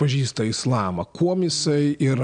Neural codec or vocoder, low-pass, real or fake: none; 9.9 kHz; real